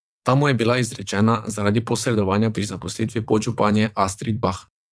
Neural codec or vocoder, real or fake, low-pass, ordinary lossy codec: vocoder, 22.05 kHz, 80 mel bands, WaveNeXt; fake; none; none